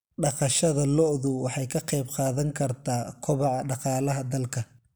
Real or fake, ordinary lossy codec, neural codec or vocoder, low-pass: real; none; none; none